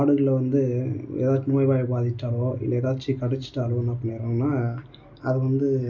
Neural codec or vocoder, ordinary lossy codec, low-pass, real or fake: none; none; 7.2 kHz; real